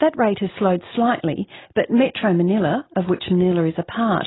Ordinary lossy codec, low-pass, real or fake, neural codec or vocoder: AAC, 16 kbps; 7.2 kHz; real; none